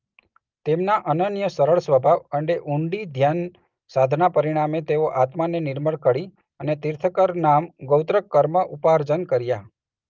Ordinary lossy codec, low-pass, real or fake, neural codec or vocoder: Opus, 24 kbps; 7.2 kHz; real; none